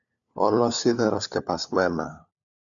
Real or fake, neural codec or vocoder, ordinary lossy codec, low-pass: fake; codec, 16 kHz, 4 kbps, FunCodec, trained on LibriTTS, 50 frames a second; MP3, 96 kbps; 7.2 kHz